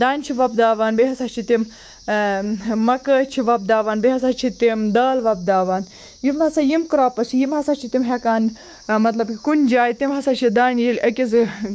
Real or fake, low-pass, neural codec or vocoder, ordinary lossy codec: fake; none; codec, 16 kHz, 6 kbps, DAC; none